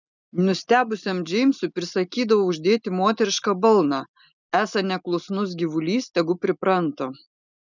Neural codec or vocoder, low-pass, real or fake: none; 7.2 kHz; real